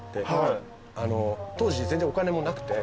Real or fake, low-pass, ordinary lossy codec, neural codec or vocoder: real; none; none; none